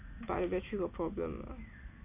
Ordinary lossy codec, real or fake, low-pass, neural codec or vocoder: none; real; 3.6 kHz; none